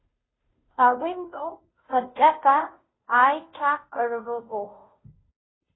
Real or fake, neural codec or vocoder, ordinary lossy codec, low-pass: fake; codec, 16 kHz, 0.5 kbps, FunCodec, trained on Chinese and English, 25 frames a second; AAC, 16 kbps; 7.2 kHz